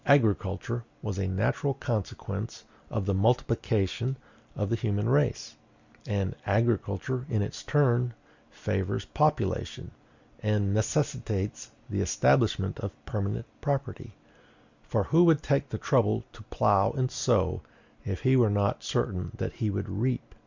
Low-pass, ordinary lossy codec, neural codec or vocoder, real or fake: 7.2 kHz; Opus, 64 kbps; none; real